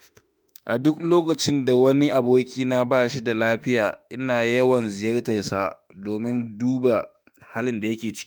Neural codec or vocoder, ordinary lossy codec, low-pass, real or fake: autoencoder, 48 kHz, 32 numbers a frame, DAC-VAE, trained on Japanese speech; none; none; fake